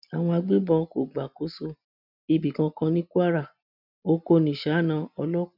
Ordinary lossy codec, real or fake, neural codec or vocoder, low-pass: none; real; none; 5.4 kHz